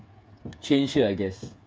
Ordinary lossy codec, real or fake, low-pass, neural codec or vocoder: none; fake; none; codec, 16 kHz, 8 kbps, FreqCodec, smaller model